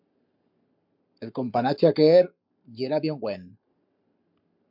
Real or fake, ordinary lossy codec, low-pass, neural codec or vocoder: fake; MP3, 48 kbps; 5.4 kHz; codec, 44.1 kHz, 7.8 kbps, Pupu-Codec